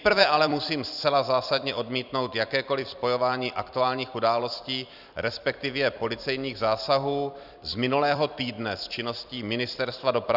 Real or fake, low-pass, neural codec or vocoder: fake; 5.4 kHz; vocoder, 44.1 kHz, 128 mel bands every 256 samples, BigVGAN v2